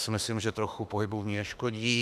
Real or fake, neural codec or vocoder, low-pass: fake; autoencoder, 48 kHz, 32 numbers a frame, DAC-VAE, trained on Japanese speech; 14.4 kHz